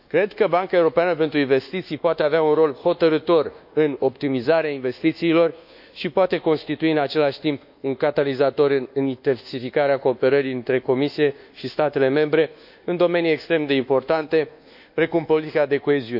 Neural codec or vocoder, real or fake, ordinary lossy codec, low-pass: codec, 24 kHz, 1.2 kbps, DualCodec; fake; none; 5.4 kHz